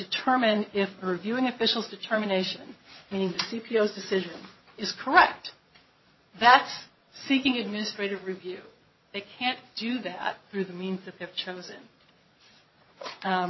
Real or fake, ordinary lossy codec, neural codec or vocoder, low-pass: fake; MP3, 24 kbps; vocoder, 22.05 kHz, 80 mel bands, Vocos; 7.2 kHz